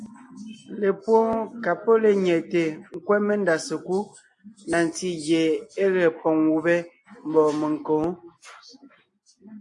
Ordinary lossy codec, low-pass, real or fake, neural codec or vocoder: AAC, 64 kbps; 10.8 kHz; real; none